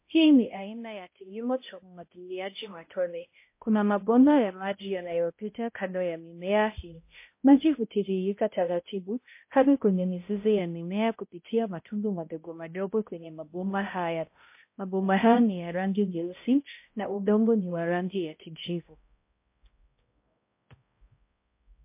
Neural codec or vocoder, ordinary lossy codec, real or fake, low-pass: codec, 16 kHz, 0.5 kbps, X-Codec, HuBERT features, trained on balanced general audio; MP3, 24 kbps; fake; 3.6 kHz